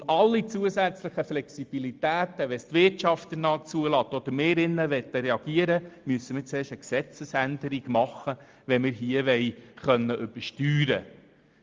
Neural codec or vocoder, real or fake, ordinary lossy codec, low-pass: none; real; Opus, 32 kbps; 7.2 kHz